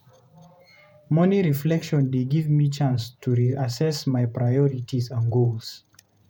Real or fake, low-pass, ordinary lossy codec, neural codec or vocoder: fake; 19.8 kHz; none; vocoder, 48 kHz, 128 mel bands, Vocos